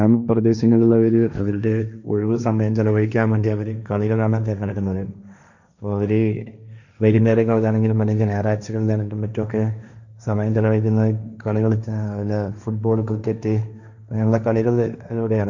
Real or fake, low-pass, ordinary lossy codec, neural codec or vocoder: fake; 7.2 kHz; none; codec, 16 kHz, 1.1 kbps, Voila-Tokenizer